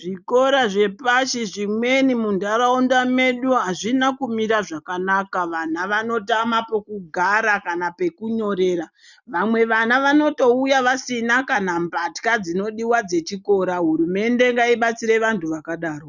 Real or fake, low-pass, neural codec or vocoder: real; 7.2 kHz; none